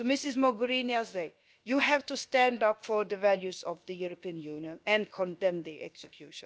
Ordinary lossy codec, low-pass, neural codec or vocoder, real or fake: none; none; codec, 16 kHz, about 1 kbps, DyCAST, with the encoder's durations; fake